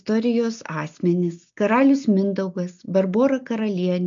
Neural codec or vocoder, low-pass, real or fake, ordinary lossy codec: none; 7.2 kHz; real; MP3, 64 kbps